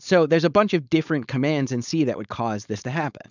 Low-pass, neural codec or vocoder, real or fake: 7.2 kHz; codec, 16 kHz, 4.8 kbps, FACodec; fake